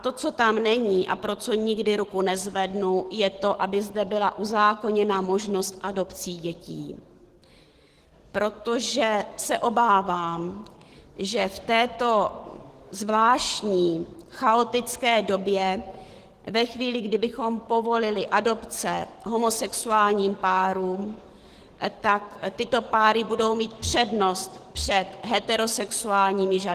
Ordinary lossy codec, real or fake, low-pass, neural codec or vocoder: Opus, 16 kbps; fake; 14.4 kHz; codec, 44.1 kHz, 7.8 kbps, DAC